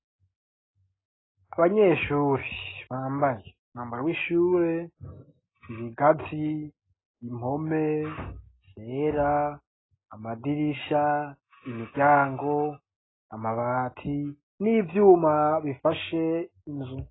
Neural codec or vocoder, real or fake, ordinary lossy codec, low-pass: none; real; AAC, 16 kbps; 7.2 kHz